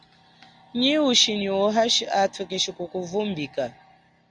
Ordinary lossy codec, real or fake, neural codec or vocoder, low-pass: Opus, 64 kbps; real; none; 9.9 kHz